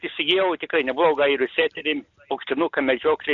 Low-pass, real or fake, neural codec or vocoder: 7.2 kHz; real; none